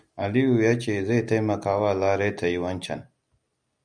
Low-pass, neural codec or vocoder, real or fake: 9.9 kHz; none; real